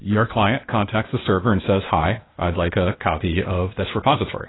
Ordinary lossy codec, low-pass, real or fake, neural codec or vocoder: AAC, 16 kbps; 7.2 kHz; fake; codec, 16 kHz, 0.8 kbps, ZipCodec